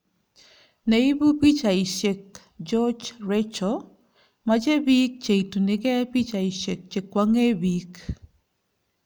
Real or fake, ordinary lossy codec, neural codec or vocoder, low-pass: real; none; none; none